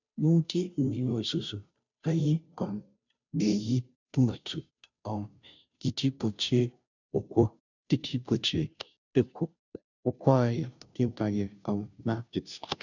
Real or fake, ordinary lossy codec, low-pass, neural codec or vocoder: fake; none; 7.2 kHz; codec, 16 kHz, 0.5 kbps, FunCodec, trained on Chinese and English, 25 frames a second